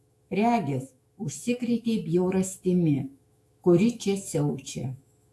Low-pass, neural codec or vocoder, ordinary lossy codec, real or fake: 14.4 kHz; autoencoder, 48 kHz, 128 numbers a frame, DAC-VAE, trained on Japanese speech; AAC, 64 kbps; fake